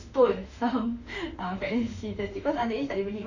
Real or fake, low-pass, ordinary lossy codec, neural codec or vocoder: fake; 7.2 kHz; none; autoencoder, 48 kHz, 32 numbers a frame, DAC-VAE, trained on Japanese speech